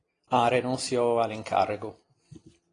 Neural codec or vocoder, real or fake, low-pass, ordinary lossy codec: none; real; 9.9 kHz; AAC, 32 kbps